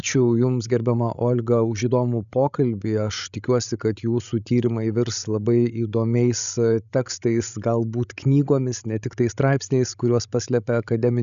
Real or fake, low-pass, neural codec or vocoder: fake; 7.2 kHz; codec, 16 kHz, 16 kbps, FreqCodec, larger model